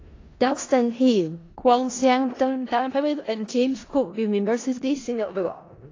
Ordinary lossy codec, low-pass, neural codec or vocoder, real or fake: AAC, 32 kbps; 7.2 kHz; codec, 16 kHz in and 24 kHz out, 0.4 kbps, LongCat-Audio-Codec, four codebook decoder; fake